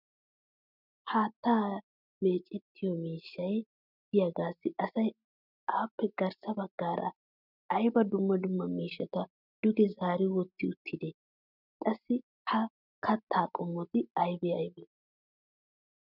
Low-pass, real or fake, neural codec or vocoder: 5.4 kHz; real; none